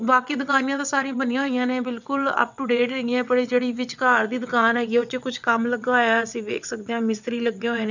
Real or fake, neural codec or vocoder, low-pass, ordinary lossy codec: fake; vocoder, 22.05 kHz, 80 mel bands, WaveNeXt; 7.2 kHz; none